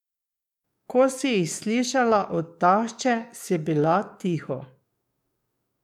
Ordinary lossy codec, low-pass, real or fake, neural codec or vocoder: none; 19.8 kHz; fake; codec, 44.1 kHz, 7.8 kbps, DAC